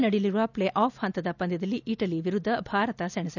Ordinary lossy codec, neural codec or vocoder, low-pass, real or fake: none; none; 7.2 kHz; real